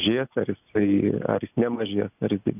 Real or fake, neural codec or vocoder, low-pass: real; none; 3.6 kHz